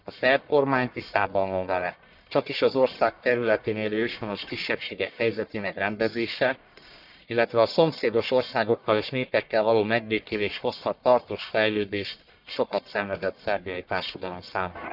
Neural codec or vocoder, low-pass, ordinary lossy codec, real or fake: codec, 44.1 kHz, 1.7 kbps, Pupu-Codec; 5.4 kHz; none; fake